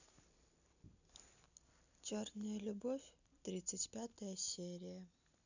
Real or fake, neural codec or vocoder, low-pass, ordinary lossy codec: real; none; 7.2 kHz; none